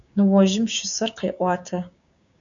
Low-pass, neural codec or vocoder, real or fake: 7.2 kHz; codec, 16 kHz, 6 kbps, DAC; fake